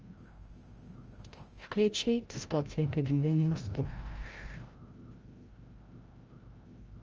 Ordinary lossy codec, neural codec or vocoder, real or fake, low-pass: Opus, 24 kbps; codec, 16 kHz, 0.5 kbps, FreqCodec, larger model; fake; 7.2 kHz